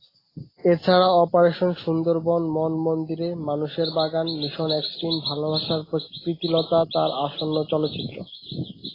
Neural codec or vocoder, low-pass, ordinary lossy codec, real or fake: none; 5.4 kHz; AAC, 24 kbps; real